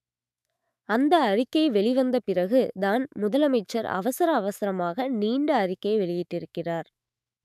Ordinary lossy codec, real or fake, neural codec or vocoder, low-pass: none; fake; autoencoder, 48 kHz, 128 numbers a frame, DAC-VAE, trained on Japanese speech; 14.4 kHz